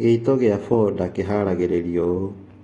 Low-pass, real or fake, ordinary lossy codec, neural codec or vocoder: 10.8 kHz; real; AAC, 32 kbps; none